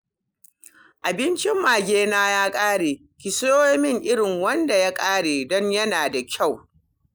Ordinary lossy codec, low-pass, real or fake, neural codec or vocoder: none; none; real; none